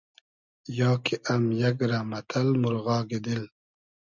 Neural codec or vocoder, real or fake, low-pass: none; real; 7.2 kHz